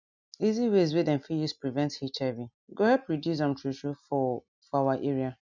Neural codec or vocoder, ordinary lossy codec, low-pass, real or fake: none; none; 7.2 kHz; real